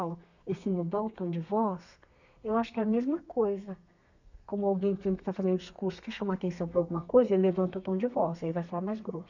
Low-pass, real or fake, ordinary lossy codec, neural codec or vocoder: 7.2 kHz; fake; none; codec, 32 kHz, 1.9 kbps, SNAC